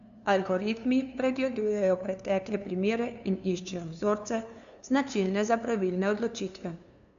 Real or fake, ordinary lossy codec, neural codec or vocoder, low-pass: fake; none; codec, 16 kHz, 2 kbps, FunCodec, trained on LibriTTS, 25 frames a second; 7.2 kHz